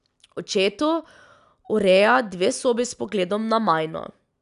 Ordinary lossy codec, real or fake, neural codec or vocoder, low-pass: none; real; none; 10.8 kHz